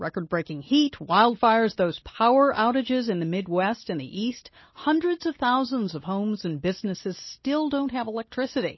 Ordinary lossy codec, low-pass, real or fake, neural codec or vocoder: MP3, 24 kbps; 7.2 kHz; real; none